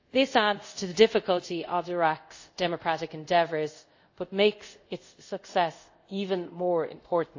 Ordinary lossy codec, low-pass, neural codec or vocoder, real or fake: AAC, 48 kbps; 7.2 kHz; codec, 24 kHz, 0.5 kbps, DualCodec; fake